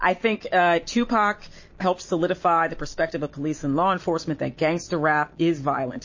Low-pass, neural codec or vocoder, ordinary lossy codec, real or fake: 7.2 kHz; none; MP3, 32 kbps; real